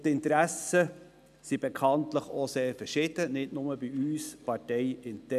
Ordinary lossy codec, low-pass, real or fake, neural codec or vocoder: none; 14.4 kHz; real; none